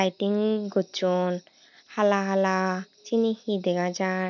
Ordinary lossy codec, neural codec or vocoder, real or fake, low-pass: none; none; real; 7.2 kHz